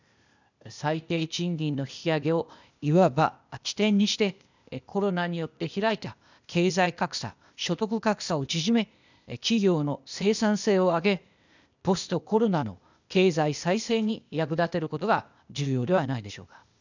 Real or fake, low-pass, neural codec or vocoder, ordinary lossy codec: fake; 7.2 kHz; codec, 16 kHz, 0.8 kbps, ZipCodec; none